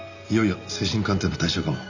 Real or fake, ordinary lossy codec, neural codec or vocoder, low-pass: real; none; none; 7.2 kHz